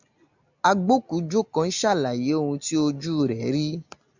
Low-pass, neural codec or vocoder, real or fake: 7.2 kHz; none; real